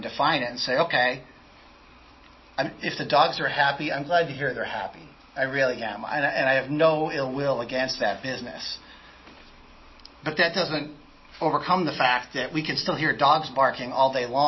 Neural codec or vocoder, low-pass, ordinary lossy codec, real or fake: none; 7.2 kHz; MP3, 24 kbps; real